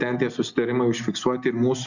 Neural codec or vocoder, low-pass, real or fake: none; 7.2 kHz; real